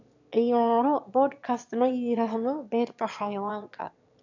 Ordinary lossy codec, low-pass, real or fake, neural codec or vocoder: none; 7.2 kHz; fake; autoencoder, 22.05 kHz, a latent of 192 numbers a frame, VITS, trained on one speaker